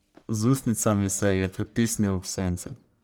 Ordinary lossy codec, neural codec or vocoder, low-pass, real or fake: none; codec, 44.1 kHz, 1.7 kbps, Pupu-Codec; none; fake